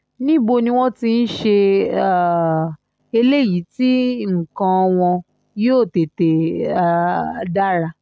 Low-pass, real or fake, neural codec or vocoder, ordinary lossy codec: none; real; none; none